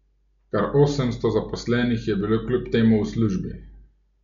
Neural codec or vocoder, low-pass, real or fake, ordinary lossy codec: none; 7.2 kHz; real; MP3, 64 kbps